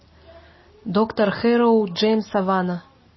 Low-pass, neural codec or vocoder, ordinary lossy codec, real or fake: 7.2 kHz; none; MP3, 24 kbps; real